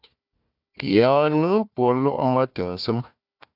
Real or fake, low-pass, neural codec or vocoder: fake; 5.4 kHz; codec, 16 kHz, 1 kbps, FunCodec, trained on Chinese and English, 50 frames a second